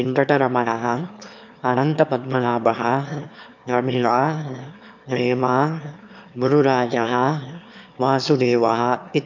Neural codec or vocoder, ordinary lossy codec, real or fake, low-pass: autoencoder, 22.05 kHz, a latent of 192 numbers a frame, VITS, trained on one speaker; none; fake; 7.2 kHz